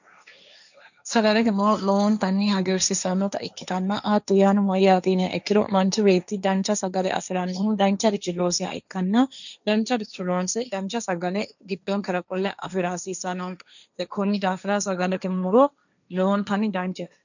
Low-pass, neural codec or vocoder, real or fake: 7.2 kHz; codec, 16 kHz, 1.1 kbps, Voila-Tokenizer; fake